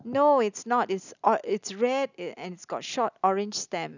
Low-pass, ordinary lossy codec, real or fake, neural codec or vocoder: 7.2 kHz; none; real; none